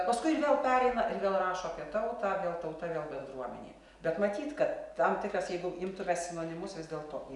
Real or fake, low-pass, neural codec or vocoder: real; 10.8 kHz; none